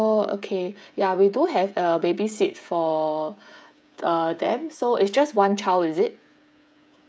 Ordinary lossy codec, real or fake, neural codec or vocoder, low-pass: none; fake; codec, 16 kHz, 16 kbps, FreqCodec, smaller model; none